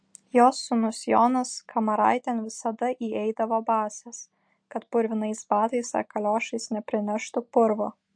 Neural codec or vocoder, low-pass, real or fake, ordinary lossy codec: none; 9.9 kHz; real; MP3, 48 kbps